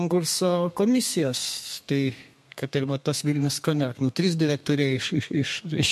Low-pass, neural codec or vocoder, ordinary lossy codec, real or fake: 14.4 kHz; codec, 32 kHz, 1.9 kbps, SNAC; MP3, 64 kbps; fake